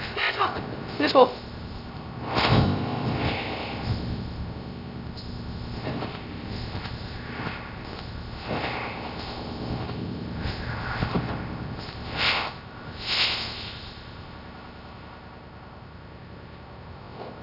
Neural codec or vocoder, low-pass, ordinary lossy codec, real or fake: codec, 16 kHz, 0.3 kbps, FocalCodec; 5.4 kHz; none; fake